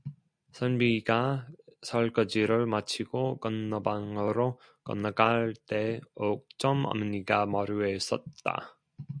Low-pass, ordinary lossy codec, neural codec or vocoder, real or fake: 9.9 kHz; MP3, 96 kbps; none; real